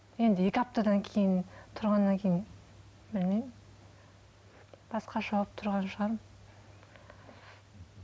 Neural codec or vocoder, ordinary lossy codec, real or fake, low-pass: none; none; real; none